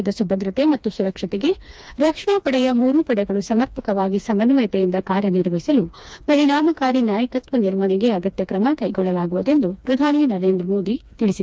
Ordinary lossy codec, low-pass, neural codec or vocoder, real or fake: none; none; codec, 16 kHz, 2 kbps, FreqCodec, smaller model; fake